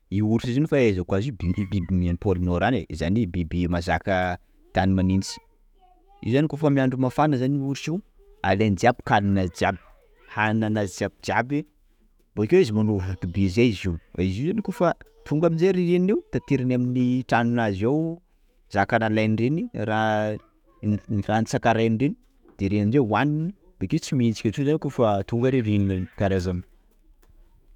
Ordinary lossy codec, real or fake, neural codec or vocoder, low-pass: none; fake; autoencoder, 48 kHz, 128 numbers a frame, DAC-VAE, trained on Japanese speech; 19.8 kHz